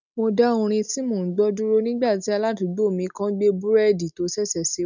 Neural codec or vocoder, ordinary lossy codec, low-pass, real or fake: none; none; 7.2 kHz; real